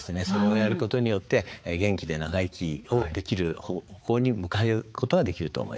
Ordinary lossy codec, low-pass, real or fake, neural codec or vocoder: none; none; fake; codec, 16 kHz, 4 kbps, X-Codec, HuBERT features, trained on balanced general audio